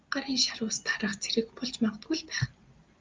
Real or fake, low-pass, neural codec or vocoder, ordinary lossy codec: real; 7.2 kHz; none; Opus, 32 kbps